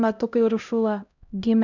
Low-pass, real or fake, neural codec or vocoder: 7.2 kHz; fake; codec, 16 kHz, 0.5 kbps, X-Codec, HuBERT features, trained on LibriSpeech